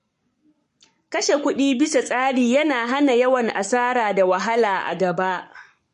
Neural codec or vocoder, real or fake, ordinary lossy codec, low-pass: codec, 44.1 kHz, 7.8 kbps, Pupu-Codec; fake; MP3, 48 kbps; 14.4 kHz